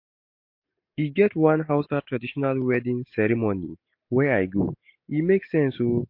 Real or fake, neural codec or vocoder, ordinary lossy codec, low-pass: real; none; MP3, 32 kbps; 5.4 kHz